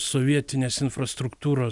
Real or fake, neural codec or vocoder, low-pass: real; none; 10.8 kHz